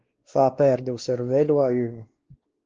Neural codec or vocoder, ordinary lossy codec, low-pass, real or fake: codec, 16 kHz, 2 kbps, X-Codec, WavLM features, trained on Multilingual LibriSpeech; Opus, 16 kbps; 7.2 kHz; fake